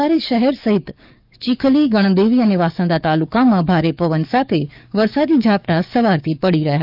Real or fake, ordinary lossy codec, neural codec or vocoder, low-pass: fake; none; codec, 44.1 kHz, 7.8 kbps, DAC; 5.4 kHz